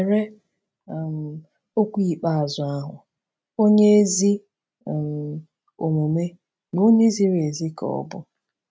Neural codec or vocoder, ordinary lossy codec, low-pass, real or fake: none; none; none; real